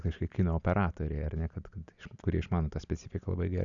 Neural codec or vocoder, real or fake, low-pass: none; real; 7.2 kHz